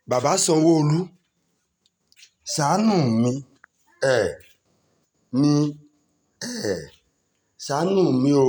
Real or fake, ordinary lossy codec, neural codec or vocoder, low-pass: real; none; none; none